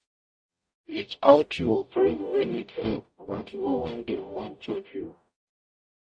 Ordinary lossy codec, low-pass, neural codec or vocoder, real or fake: MP3, 48 kbps; 9.9 kHz; codec, 44.1 kHz, 0.9 kbps, DAC; fake